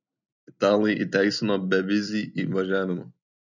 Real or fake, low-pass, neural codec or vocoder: real; 7.2 kHz; none